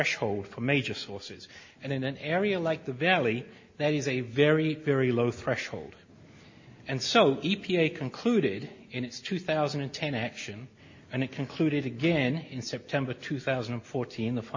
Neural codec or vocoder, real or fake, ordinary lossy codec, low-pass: none; real; MP3, 32 kbps; 7.2 kHz